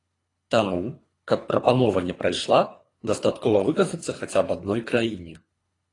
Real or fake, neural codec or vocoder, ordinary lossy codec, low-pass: fake; codec, 24 kHz, 3 kbps, HILCodec; AAC, 32 kbps; 10.8 kHz